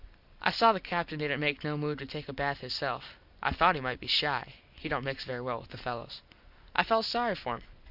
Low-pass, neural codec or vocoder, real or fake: 5.4 kHz; none; real